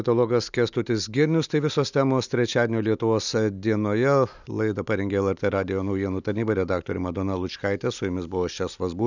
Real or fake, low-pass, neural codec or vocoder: real; 7.2 kHz; none